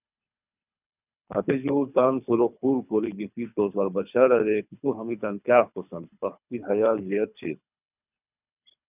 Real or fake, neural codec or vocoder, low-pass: fake; codec, 24 kHz, 3 kbps, HILCodec; 3.6 kHz